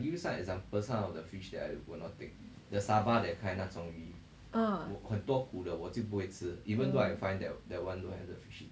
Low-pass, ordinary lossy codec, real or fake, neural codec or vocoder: none; none; real; none